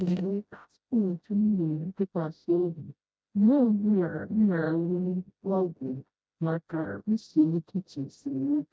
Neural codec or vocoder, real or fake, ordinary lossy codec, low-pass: codec, 16 kHz, 0.5 kbps, FreqCodec, smaller model; fake; none; none